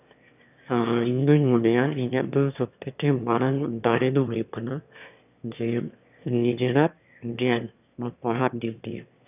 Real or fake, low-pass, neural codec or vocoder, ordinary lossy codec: fake; 3.6 kHz; autoencoder, 22.05 kHz, a latent of 192 numbers a frame, VITS, trained on one speaker; none